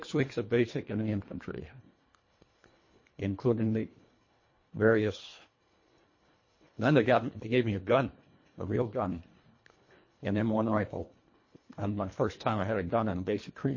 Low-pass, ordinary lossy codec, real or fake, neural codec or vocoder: 7.2 kHz; MP3, 32 kbps; fake; codec, 24 kHz, 1.5 kbps, HILCodec